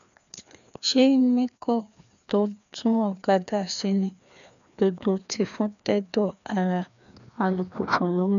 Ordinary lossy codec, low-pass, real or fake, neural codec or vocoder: none; 7.2 kHz; fake; codec, 16 kHz, 2 kbps, FreqCodec, larger model